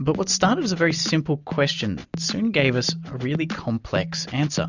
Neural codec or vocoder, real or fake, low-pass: none; real; 7.2 kHz